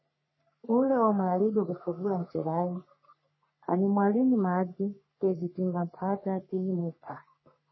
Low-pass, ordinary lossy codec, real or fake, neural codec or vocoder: 7.2 kHz; MP3, 24 kbps; fake; codec, 44.1 kHz, 3.4 kbps, Pupu-Codec